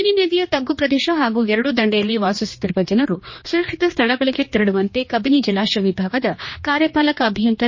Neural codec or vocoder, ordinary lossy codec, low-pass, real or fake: codec, 16 kHz, 2 kbps, X-Codec, HuBERT features, trained on general audio; MP3, 32 kbps; 7.2 kHz; fake